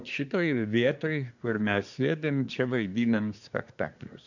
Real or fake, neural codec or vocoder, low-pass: fake; codec, 24 kHz, 1 kbps, SNAC; 7.2 kHz